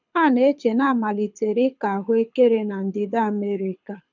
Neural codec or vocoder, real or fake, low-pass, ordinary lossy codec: codec, 24 kHz, 6 kbps, HILCodec; fake; 7.2 kHz; none